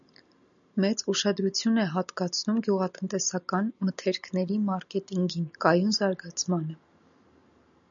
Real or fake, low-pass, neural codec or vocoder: real; 7.2 kHz; none